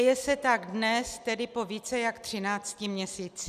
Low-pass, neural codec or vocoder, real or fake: 14.4 kHz; none; real